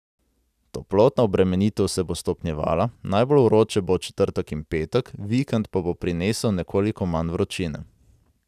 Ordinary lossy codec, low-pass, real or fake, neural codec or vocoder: none; 14.4 kHz; real; none